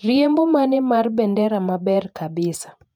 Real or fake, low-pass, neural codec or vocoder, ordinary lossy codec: fake; 19.8 kHz; vocoder, 48 kHz, 128 mel bands, Vocos; none